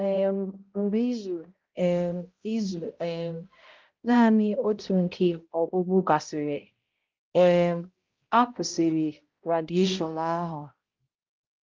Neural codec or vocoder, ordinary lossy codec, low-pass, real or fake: codec, 16 kHz, 0.5 kbps, X-Codec, HuBERT features, trained on balanced general audio; Opus, 32 kbps; 7.2 kHz; fake